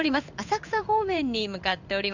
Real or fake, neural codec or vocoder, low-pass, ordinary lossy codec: fake; codec, 16 kHz in and 24 kHz out, 1 kbps, XY-Tokenizer; 7.2 kHz; none